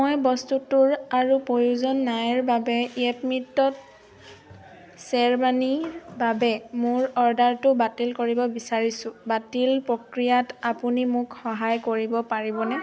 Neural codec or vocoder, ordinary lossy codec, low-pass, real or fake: none; none; none; real